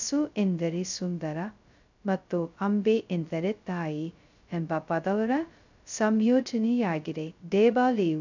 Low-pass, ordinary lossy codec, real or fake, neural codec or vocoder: 7.2 kHz; none; fake; codec, 16 kHz, 0.2 kbps, FocalCodec